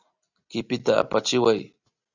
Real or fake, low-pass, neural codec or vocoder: real; 7.2 kHz; none